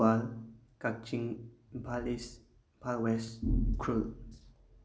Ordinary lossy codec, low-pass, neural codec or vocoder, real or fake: none; none; none; real